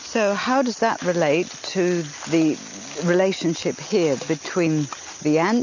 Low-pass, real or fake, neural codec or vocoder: 7.2 kHz; real; none